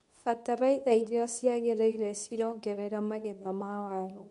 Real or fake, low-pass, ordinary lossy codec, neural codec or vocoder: fake; 10.8 kHz; MP3, 96 kbps; codec, 24 kHz, 0.9 kbps, WavTokenizer, medium speech release version 2